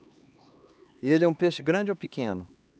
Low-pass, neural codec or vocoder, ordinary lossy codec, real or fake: none; codec, 16 kHz, 2 kbps, X-Codec, HuBERT features, trained on LibriSpeech; none; fake